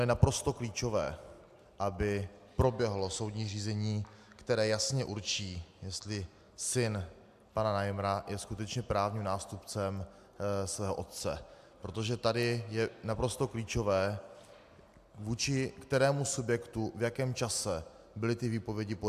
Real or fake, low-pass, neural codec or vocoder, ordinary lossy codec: real; 14.4 kHz; none; AAC, 96 kbps